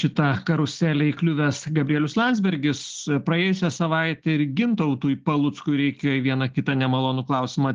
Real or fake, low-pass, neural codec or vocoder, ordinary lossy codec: real; 7.2 kHz; none; Opus, 16 kbps